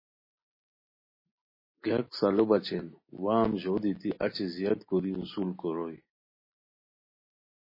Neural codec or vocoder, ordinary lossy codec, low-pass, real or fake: none; MP3, 24 kbps; 5.4 kHz; real